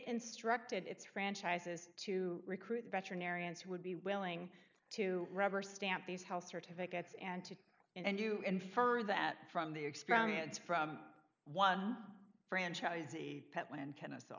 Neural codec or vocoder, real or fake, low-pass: none; real; 7.2 kHz